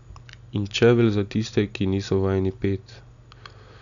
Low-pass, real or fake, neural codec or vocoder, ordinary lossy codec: 7.2 kHz; real; none; none